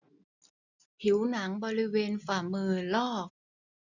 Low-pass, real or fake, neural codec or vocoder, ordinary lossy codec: 7.2 kHz; real; none; none